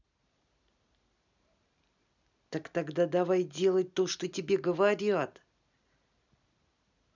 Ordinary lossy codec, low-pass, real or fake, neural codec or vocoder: none; 7.2 kHz; real; none